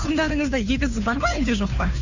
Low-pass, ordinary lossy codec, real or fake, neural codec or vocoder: 7.2 kHz; none; fake; codec, 16 kHz, 2 kbps, FunCodec, trained on Chinese and English, 25 frames a second